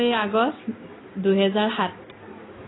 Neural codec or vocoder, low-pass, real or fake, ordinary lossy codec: none; 7.2 kHz; real; AAC, 16 kbps